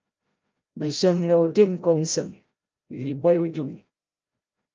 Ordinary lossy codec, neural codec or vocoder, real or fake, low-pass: Opus, 24 kbps; codec, 16 kHz, 0.5 kbps, FreqCodec, larger model; fake; 7.2 kHz